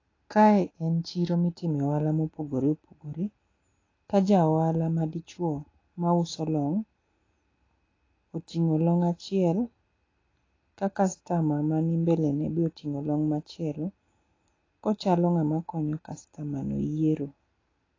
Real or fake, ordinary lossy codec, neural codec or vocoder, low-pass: real; AAC, 32 kbps; none; 7.2 kHz